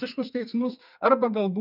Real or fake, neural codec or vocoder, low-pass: fake; codec, 32 kHz, 1.9 kbps, SNAC; 5.4 kHz